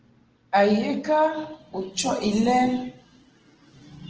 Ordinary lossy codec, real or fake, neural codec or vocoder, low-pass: Opus, 16 kbps; real; none; 7.2 kHz